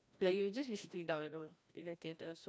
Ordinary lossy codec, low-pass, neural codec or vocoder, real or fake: none; none; codec, 16 kHz, 1 kbps, FreqCodec, larger model; fake